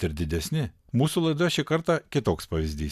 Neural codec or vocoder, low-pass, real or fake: none; 14.4 kHz; real